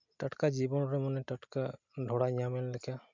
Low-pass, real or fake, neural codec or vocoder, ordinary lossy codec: 7.2 kHz; real; none; MP3, 64 kbps